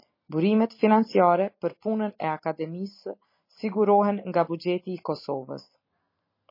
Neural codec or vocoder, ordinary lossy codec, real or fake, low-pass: none; MP3, 24 kbps; real; 5.4 kHz